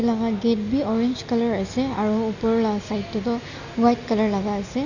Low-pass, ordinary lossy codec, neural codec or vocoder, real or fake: 7.2 kHz; none; none; real